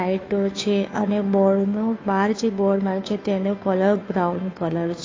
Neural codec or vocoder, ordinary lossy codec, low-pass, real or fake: codec, 16 kHz, 2 kbps, FunCodec, trained on Chinese and English, 25 frames a second; AAC, 32 kbps; 7.2 kHz; fake